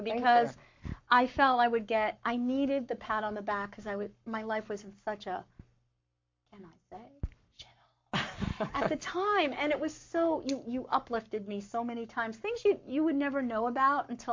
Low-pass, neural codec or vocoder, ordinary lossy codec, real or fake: 7.2 kHz; codec, 44.1 kHz, 7.8 kbps, Pupu-Codec; MP3, 48 kbps; fake